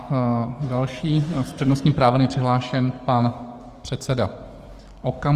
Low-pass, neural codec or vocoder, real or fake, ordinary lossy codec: 14.4 kHz; codec, 44.1 kHz, 7.8 kbps, DAC; fake; Opus, 24 kbps